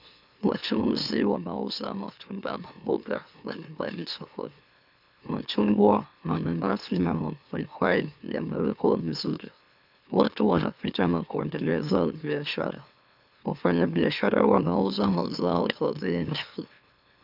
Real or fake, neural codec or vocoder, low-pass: fake; autoencoder, 44.1 kHz, a latent of 192 numbers a frame, MeloTTS; 5.4 kHz